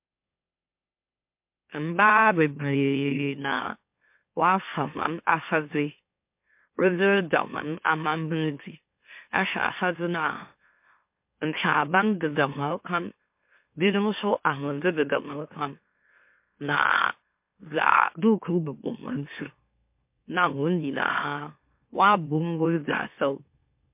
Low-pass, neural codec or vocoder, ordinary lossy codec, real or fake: 3.6 kHz; autoencoder, 44.1 kHz, a latent of 192 numbers a frame, MeloTTS; MP3, 32 kbps; fake